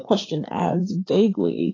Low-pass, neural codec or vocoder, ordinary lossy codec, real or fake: 7.2 kHz; codec, 16 kHz, 4 kbps, FreqCodec, larger model; AAC, 32 kbps; fake